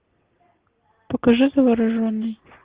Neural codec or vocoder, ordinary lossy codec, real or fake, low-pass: none; Opus, 16 kbps; real; 3.6 kHz